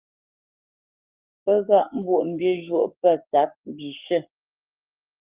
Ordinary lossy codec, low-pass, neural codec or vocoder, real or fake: Opus, 32 kbps; 3.6 kHz; codec, 44.1 kHz, 7.8 kbps, Pupu-Codec; fake